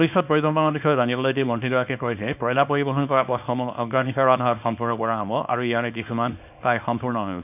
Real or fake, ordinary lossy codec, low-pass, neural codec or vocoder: fake; none; 3.6 kHz; codec, 24 kHz, 0.9 kbps, WavTokenizer, small release